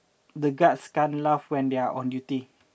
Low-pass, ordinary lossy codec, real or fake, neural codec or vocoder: none; none; real; none